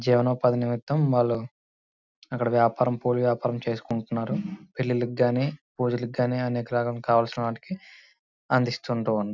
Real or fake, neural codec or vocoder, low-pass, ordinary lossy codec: real; none; 7.2 kHz; none